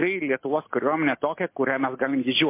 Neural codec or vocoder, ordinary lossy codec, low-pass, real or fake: none; MP3, 24 kbps; 3.6 kHz; real